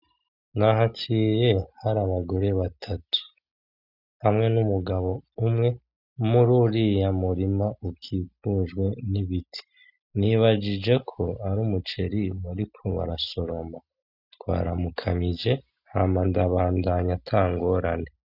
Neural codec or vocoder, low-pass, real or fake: none; 5.4 kHz; real